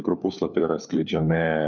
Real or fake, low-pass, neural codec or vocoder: fake; 7.2 kHz; codec, 16 kHz, 4 kbps, FunCodec, trained on LibriTTS, 50 frames a second